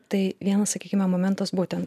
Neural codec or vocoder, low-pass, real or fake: none; 14.4 kHz; real